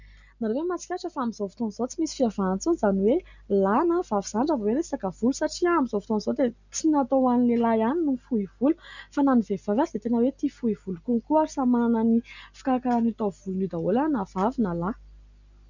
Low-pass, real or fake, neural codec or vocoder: 7.2 kHz; real; none